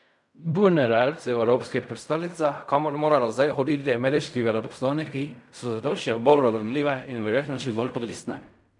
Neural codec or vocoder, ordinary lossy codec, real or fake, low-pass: codec, 16 kHz in and 24 kHz out, 0.4 kbps, LongCat-Audio-Codec, fine tuned four codebook decoder; none; fake; 10.8 kHz